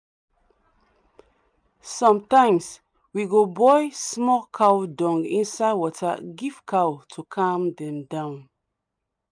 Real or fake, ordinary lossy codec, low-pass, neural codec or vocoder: real; none; 9.9 kHz; none